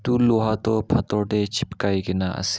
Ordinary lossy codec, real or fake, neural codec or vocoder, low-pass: none; real; none; none